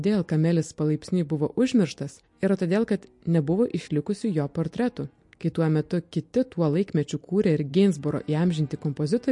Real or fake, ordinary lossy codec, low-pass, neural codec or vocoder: real; MP3, 48 kbps; 10.8 kHz; none